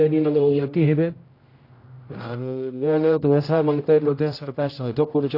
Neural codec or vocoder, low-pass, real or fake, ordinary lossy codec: codec, 16 kHz, 0.5 kbps, X-Codec, HuBERT features, trained on general audio; 5.4 kHz; fake; AAC, 32 kbps